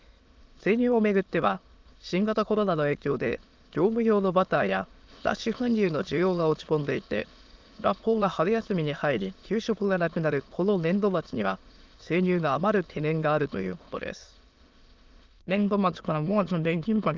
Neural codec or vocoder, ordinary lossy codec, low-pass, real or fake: autoencoder, 22.05 kHz, a latent of 192 numbers a frame, VITS, trained on many speakers; Opus, 24 kbps; 7.2 kHz; fake